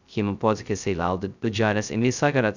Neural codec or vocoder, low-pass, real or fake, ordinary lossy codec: codec, 16 kHz, 0.2 kbps, FocalCodec; 7.2 kHz; fake; none